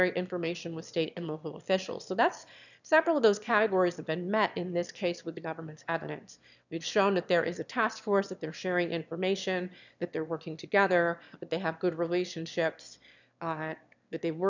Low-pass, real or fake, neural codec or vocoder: 7.2 kHz; fake; autoencoder, 22.05 kHz, a latent of 192 numbers a frame, VITS, trained on one speaker